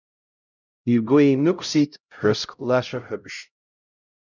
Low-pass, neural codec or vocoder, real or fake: 7.2 kHz; codec, 16 kHz, 0.5 kbps, X-Codec, HuBERT features, trained on LibriSpeech; fake